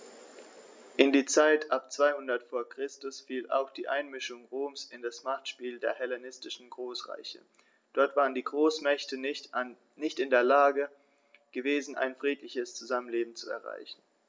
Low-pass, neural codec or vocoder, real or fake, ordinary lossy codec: none; none; real; none